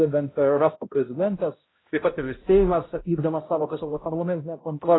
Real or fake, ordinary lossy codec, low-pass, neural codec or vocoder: fake; AAC, 16 kbps; 7.2 kHz; codec, 16 kHz, 0.5 kbps, X-Codec, HuBERT features, trained on balanced general audio